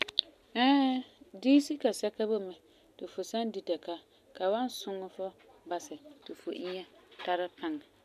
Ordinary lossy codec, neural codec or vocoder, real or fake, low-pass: none; none; real; 14.4 kHz